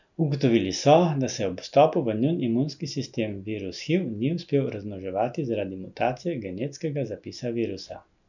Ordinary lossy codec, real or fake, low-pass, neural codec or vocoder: none; real; 7.2 kHz; none